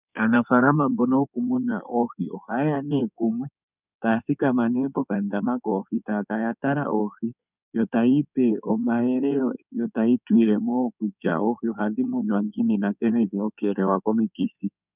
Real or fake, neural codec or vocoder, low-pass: fake; codec, 16 kHz in and 24 kHz out, 2.2 kbps, FireRedTTS-2 codec; 3.6 kHz